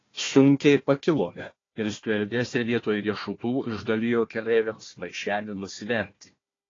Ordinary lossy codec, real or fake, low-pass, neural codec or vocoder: AAC, 32 kbps; fake; 7.2 kHz; codec, 16 kHz, 1 kbps, FunCodec, trained on Chinese and English, 50 frames a second